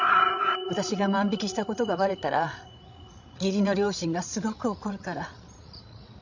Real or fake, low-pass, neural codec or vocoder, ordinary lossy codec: fake; 7.2 kHz; codec, 16 kHz, 16 kbps, FreqCodec, larger model; none